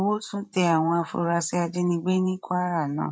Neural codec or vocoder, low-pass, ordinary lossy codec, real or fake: codec, 16 kHz, 16 kbps, FreqCodec, larger model; none; none; fake